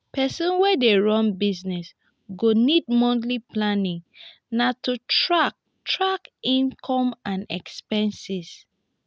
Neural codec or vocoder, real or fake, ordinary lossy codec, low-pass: none; real; none; none